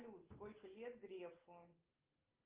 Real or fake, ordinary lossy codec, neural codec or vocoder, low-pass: real; Opus, 24 kbps; none; 3.6 kHz